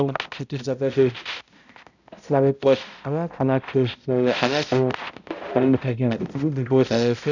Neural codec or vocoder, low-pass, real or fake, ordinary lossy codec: codec, 16 kHz, 0.5 kbps, X-Codec, HuBERT features, trained on balanced general audio; 7.2 kHz; fake; none